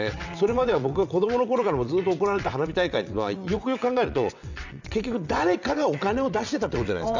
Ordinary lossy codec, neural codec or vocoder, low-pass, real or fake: none; vocoder, 22.05 kHz, 80 mel bands, WaveNeXt; 7.2 kHz; fake